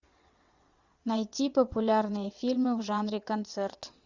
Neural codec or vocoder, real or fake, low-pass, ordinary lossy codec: vocoder, 22.05 kHz, 80 mel bands, WaveNeXt; fake; 7.2 kHz; Opus, 64 kbps